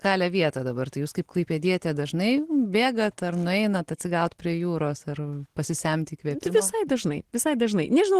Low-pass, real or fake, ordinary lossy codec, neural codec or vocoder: 14.4 kHz; real; Opus, 16 kbps; none